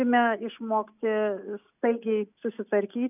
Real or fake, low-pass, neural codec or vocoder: real; 3.6 kHz; none